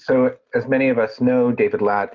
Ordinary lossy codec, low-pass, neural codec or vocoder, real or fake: Opus, 24 kbps; 7.2 kHz; none; real